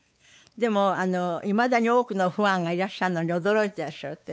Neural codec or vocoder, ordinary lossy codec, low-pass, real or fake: codec, 16 kHz, 4 kbps, X-Codec, WavLM features, trained on Multilingual LibriSpeech; none; none; fake